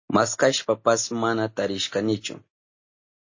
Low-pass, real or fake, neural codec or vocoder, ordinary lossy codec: 7.2 kHz; real; none; MP3, 32 kbps